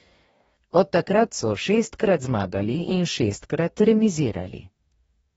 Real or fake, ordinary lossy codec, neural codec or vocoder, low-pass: fake; AAC, 24 kbps; codec, 44.1 kHz, 2.6 kbps, DAC; 19.8 kHz